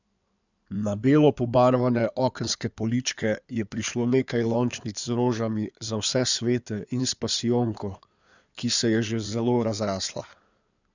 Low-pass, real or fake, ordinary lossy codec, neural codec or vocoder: 7.2 kHz; fake; none; codec, 16 kHz in and 24 kHz out, 2.2 kbps, FireRedTTS-2 codec